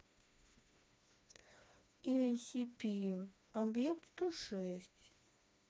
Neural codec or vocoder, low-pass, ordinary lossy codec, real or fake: codec, 16 kHz, 2 kbps, FreqCodec, smaller model; none; none; fake